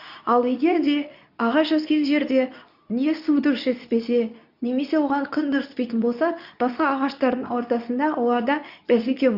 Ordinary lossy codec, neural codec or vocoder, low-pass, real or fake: none; codec, 24 kHz, 0.9 kbps, WavTokenizer, medium speech release version 2; 5.4 kHz; fake